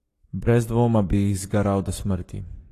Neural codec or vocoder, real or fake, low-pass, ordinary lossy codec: codec, 44.1 kHz, 7.8 kbps, Pupu-Codec; fake; 14.4 kHz; AAC, 48 kbps